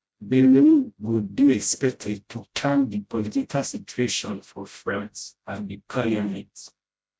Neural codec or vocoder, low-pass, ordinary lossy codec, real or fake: codec, 16 kHz, 0.5 kbps, FreqCodec, smaller model; none; none; fake